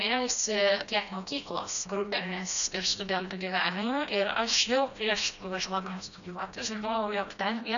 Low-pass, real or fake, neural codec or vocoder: 7.2 kHz; fake; codec, 16 kHz, 1 kbps, FreqCodec, smaller model